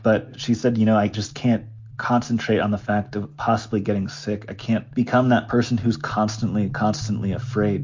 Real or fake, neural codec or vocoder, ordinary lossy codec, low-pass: real; none; MP3, 48 kbps; 7.2 kHz